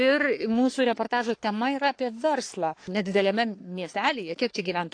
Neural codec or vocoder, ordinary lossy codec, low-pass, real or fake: codec, 44.1 kHz, 3.4 kbps, Pupu-Codec; AAC, 48 kbps; 9.9 kHz; fake